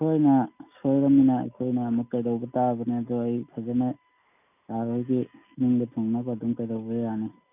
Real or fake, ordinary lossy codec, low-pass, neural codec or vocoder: real; none; 3.6 kHz; none